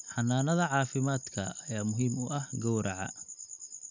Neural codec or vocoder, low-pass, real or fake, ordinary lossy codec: none; 7.2 kHz; real; none